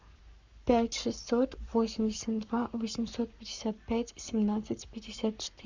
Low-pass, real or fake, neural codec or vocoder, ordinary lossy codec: 7.2 kHz; fake; codec, 44.1 kHz, 7.8 kbps, Pupu-Codec; Opus, 64 kbps